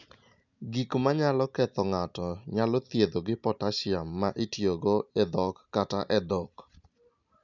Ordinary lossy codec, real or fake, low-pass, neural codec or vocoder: none; real; 7.2 kHz; none